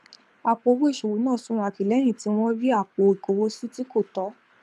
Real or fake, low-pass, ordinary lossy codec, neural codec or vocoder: fake; none; none; codec, 24 kHz, 6 kbps, HILCodec